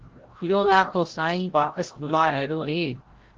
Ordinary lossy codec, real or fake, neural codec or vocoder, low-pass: Opus, 16 kbps; fake; codec, 16 kHz, 0.5 kbps, FreqCodec, larger model; 7.2 kHz